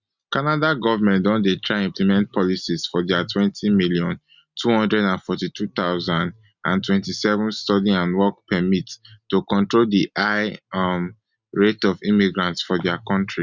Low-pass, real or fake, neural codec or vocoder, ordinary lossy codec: 7.2 kHz; real; none; Opus, 64 kbps